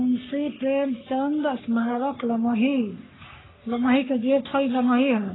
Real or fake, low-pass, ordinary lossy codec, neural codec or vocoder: fake; 7.2 kHz; AAC, 16 kbps; codec, 44.1 kHz, 3.4 kbps, Pupu-Codec